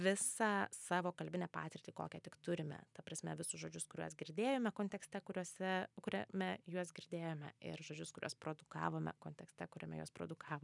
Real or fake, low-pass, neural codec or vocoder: real; 10.8 kHz; none